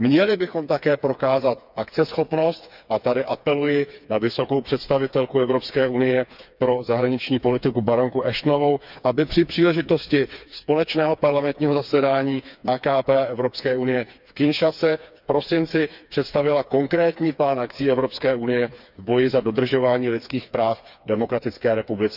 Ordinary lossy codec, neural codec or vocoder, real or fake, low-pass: none; codec, 16 kHz, 4 kbps, FreqCodec, smaller model; fake; 5.4 kHz